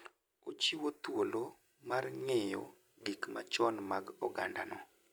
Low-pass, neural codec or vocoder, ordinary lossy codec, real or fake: none; none; none; real